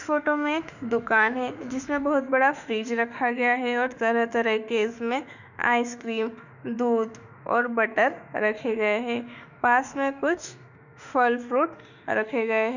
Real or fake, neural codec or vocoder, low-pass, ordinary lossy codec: fake; autoencoder, 48 kHz, 32 numbers a frame, DAC-VAE, trained on Japanese speech; 7.2 kHz; none